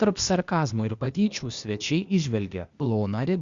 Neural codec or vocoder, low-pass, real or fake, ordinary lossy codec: codec, 16 kHz, 0.8 kbps, ZipCodec; 7.2 kHz; fake; Opus, 64 kbps